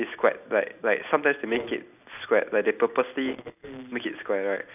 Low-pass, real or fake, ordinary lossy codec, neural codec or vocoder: 3.6 kHz; real; none; none